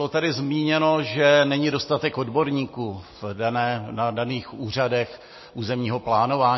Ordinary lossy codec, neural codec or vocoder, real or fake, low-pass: MP3, 24 kbps; none; real; 7.2 kHz